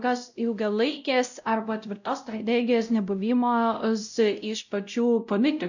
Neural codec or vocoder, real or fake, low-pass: codec, 16 kHz, 0.5 kbps, X-Codec, WavLM features, trained on Multilingual LibriSpeech; fake; 7.2 kHz